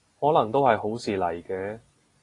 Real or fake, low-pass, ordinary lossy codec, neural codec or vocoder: real; 10.8 kHz; AAC, 32 kbps; none